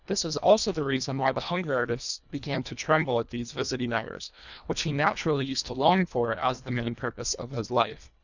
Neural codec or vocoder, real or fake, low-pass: codec, 24 kHz, 1.5 kbps, HILCodec; fake; 7.2 kHz